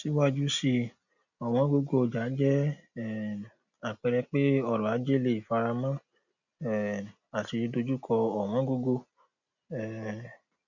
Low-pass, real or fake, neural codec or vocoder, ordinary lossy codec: 7.2 kHz; real; none; none